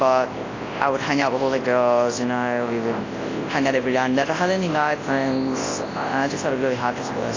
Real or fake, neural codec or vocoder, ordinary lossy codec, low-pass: fake; codec, 24 kHz, 0.9 kbps, WavTokenizer, large speech release; AAC, 32 kbps; 7.2 kHz